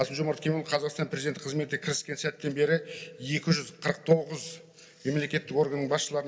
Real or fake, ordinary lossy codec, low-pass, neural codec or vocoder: real; none; none; none